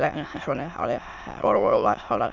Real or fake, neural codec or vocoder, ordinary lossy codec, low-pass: fake; autoencoder, 22.05 kHz, a latent of 192 numbers a frame, VITS, trained on many speakers; none; 7.2 kHz